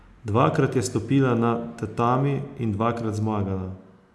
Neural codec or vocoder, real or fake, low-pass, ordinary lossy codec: none; real; none; none